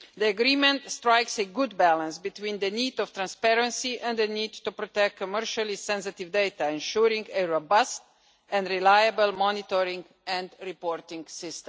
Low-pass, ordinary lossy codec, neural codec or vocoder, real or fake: none; none; none; real